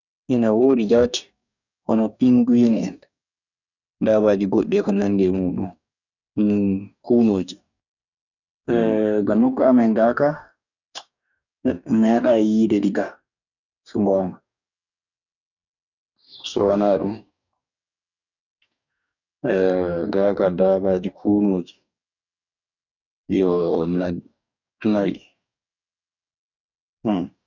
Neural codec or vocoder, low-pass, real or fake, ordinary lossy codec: codec, 44.1 kHz, 2.6 kbps, DAC; 7.2 kHz; fake; none